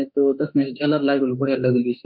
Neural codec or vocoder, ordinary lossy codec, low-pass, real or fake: autoencoder, 48 kHz, 32 numbers a frame, DAC-VAE, trained on Japanese speech; none; 5.4 kHz; fake